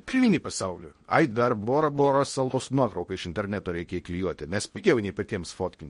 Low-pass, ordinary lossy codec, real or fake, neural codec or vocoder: 10.8 kHz; MP3, 48 kbps; fake; codec, 16 kHz in and 24 kHz out, 0.8 kbps, FocalCodec, streaming, 65536 codes